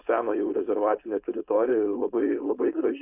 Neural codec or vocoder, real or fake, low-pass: codec, 16 kHz, 4.8 kbps, FACodec; fake; 3.6 kHz